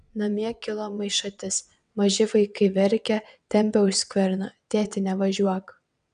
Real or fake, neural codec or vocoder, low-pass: fake; vocoder, 22.05 kHz, 80 mel bands, WaveNeXt; 9.9 kHz